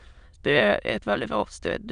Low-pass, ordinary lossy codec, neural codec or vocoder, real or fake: 9.9 kHz; none; autoencoder, 22.05 kHz, a latent of 192 numbers a frame, VITS, trained on many speakers; fake